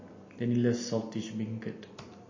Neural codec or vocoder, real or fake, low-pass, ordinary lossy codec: none; real; 7.2 kHz; MP3, 32 kbps